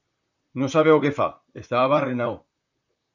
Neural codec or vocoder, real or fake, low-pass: vocoder, 44.1 kHz, 128 mel bands, Pupu-Vocoder; fake; 7.2 kHz